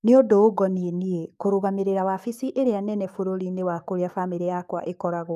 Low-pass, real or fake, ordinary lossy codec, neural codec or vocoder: 14.4 kHz; fake; none; codec, 44.1 kHz, 7.8 kbps, DAC